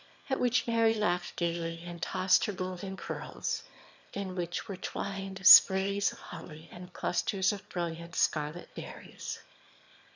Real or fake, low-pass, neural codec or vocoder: fake; 7.2 kHz; autoencoder, 22.05 kHz, a latent of 192 numbers a frame, VITS, trained on one speaker